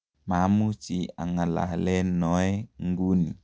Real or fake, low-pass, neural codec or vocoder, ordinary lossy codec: real; none; none; none